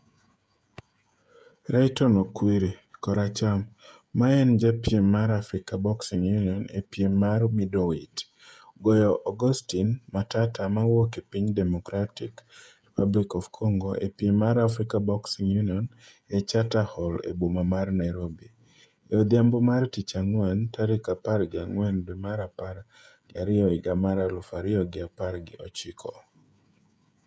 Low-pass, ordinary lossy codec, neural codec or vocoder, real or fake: none; none; codec, 16 kHz, 16 kbps, FreqCodec, smaller model; fake